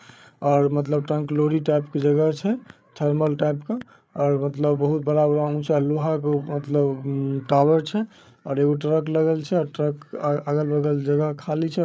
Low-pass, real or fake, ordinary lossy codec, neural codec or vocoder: none; fake; none; codec, 16 kHz, 8 kbps, FreqCodec, larger model